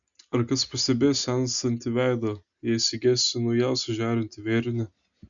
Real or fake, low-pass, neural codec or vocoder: real; 7.2 kHz; none